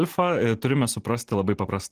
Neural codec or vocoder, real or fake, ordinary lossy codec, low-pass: none; real; Opus, 16 kbps; 14.4 kHz